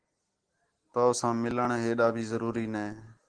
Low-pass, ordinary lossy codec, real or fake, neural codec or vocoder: 9.9 kHz; Opus, 16 kbps; real; none